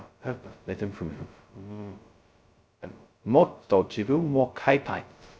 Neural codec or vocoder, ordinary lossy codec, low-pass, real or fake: codec, 16 kHz, 0.2 kbps, FocalCodec; none; none; fake